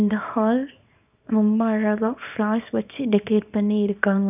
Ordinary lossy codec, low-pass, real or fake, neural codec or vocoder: none; 3.6 kHz; fake; codec, 24 kHz, 0.9 kbps, WavTokenizer, small release